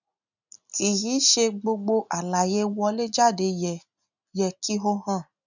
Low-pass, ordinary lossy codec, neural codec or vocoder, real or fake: 7.2 kHz; none; none; real